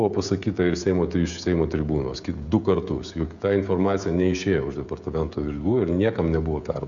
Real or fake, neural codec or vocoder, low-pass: fake; codec, 16 kHz, 16 kbps, FreqCodec, smaller model; 7.2 kHz